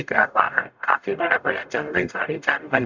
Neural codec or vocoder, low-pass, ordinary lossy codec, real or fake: codec, 44.1 kHz, 0.9 kbps, DAC; 7.2 kHz; none; fake